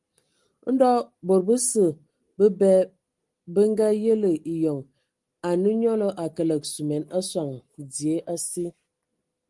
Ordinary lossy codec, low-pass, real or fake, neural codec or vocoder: Opus, 24 kbps; 10.8 kHz; real; none